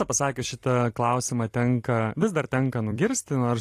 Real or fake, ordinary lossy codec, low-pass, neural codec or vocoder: fake; AAC, 48 kbps; 14.4 kHz; vocoder, 44.1 kHz, 128 mel bands every 256 samples, BigVGAN v2